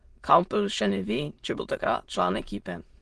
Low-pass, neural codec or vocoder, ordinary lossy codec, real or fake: 9.9 kHz; autoencoder, 22.05 kHz, a latent of 192 numbers a frame, VITS, trained on many speakers; Opus, 16 kbps; fake